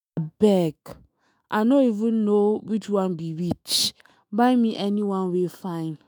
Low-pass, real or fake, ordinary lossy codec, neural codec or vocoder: none; fake; none; autoencoder, 48 kHz, 128 numbers a frame, DAC-VAE, trained on Japanese speech